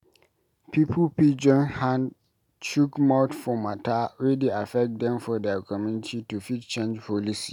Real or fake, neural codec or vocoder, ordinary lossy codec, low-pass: real; none; none; 19.8 kHz